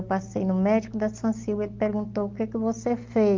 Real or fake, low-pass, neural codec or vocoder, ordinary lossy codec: real; 7.2 kHz; none; Opus, 32 kbps